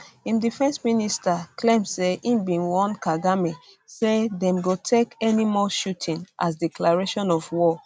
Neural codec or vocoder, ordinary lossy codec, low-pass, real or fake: none; none; none; real